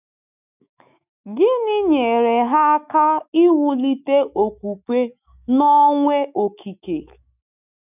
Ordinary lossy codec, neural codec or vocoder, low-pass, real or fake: none; autoencoder, 48 kHz, 128 numbers a frame, DAC-VAE, trained on Japanese speech; 3.6 kHz; fake